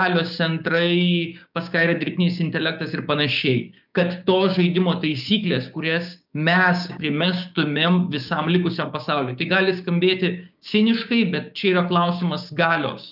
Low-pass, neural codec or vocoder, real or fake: 5.4 kHz; codec, 16 kHz, 6 kbps, DAC; fake